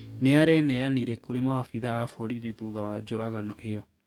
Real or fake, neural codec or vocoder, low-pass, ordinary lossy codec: fake; codec, 44.1 kHz, 2.6 kbps, DAC; 19.8 kHz; none